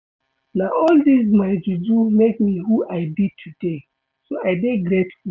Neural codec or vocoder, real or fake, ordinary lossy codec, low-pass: none; real; none; none